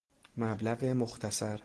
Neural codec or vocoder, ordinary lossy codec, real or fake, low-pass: none; Opus, 16 kbps; real; 10.8 kHz